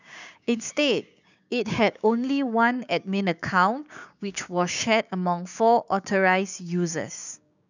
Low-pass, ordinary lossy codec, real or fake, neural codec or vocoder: 7.2 kHz; none; fake; codec, 16 kHz, 6 kbps, DAC